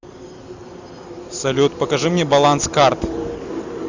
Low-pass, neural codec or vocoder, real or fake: 7.2 kHz; none; real